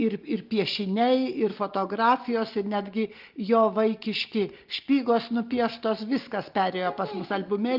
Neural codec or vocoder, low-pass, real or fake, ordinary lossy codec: none; 5.4 kHz; real; Opus, 24 kbps